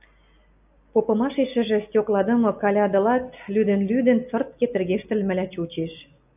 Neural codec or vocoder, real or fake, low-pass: none; real; 3.6 kHz